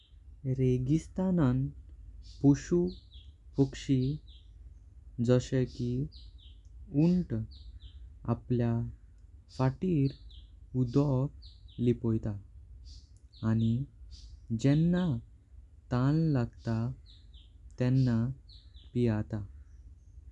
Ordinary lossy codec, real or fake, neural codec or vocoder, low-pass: none; real; none; 10.8 kHz